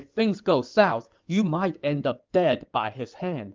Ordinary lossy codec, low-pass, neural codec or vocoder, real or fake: Opus, 32 kbps; 7.2 kHz; codec, 16 kHz in and 24 kHz out, 2.2 kbps, FireRedTTS-2 codec; fake